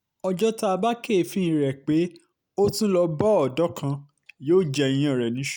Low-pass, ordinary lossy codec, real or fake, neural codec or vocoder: none; none; real; none